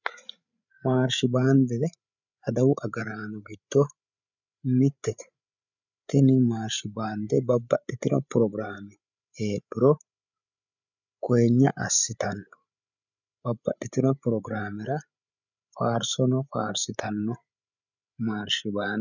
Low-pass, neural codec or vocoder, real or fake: 7.2 kHz; codec, 16 kHz, 8 kbps, FreqCodec, larger model; fake